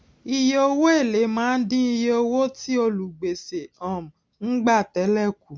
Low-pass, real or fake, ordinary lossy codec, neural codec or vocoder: none; real; none; none